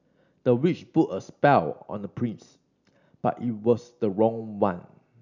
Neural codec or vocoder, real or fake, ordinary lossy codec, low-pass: none; real; none; 7.2 kHz